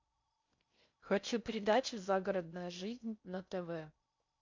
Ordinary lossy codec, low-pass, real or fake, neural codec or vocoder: MP3, 64 kbps; 7.2 kHz; fake; codec, 16 kHz in and 24 kHz out, 0.6 kbps, FocalCodec, streaming, 4096 codes